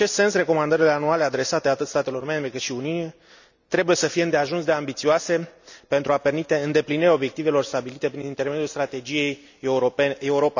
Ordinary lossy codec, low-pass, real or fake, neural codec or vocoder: none; 7.2 kHz; real; none